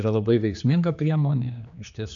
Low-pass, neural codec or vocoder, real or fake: 7.2 kHz; codec, 16 kHz, 2 kbps, X-Codec, HuBERT features, trained on general audio; fake